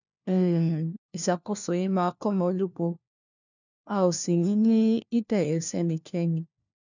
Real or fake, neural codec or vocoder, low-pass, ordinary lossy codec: fake; codec, 16 kHz, 1 kbps, FunCodec, trained on LibriTTS, 50 frames a second; 7.2 kHz; none